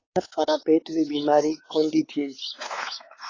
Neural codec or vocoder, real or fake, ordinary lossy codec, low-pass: codec, 16 kHz, 4 kbps, X-Codec, WavLM features, trained on Multilingual LibriSpeech; fake; AAC, 32 kbps; 7.2 kHz